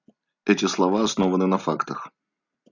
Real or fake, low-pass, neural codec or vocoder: real; 7.2 kHz; none